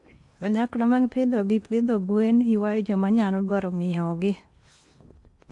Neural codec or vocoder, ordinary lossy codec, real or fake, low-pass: codec, 16 kHz in and 24 kHz out, 0.8 kbps, FocalCodec, streaming, 65536 codes; AAC, 64 kbps; fake; 10.8 kHz